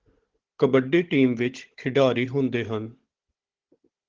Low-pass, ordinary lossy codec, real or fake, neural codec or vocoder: 7.2 kHz; Opus, 16 kbps; fake; codec, 16 kHz, 16 kbps, FunCodec, trained on Chinese and English, 50 frames a second